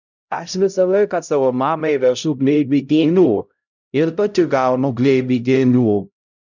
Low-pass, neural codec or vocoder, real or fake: 7.2 kHz; codec, 16 kHz, 0.5 kbps, X-Codec, HuBERT features, trained on LibriSpeech; fake